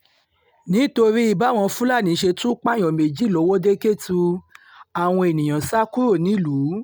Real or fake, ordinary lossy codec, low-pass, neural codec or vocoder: real; none; none; none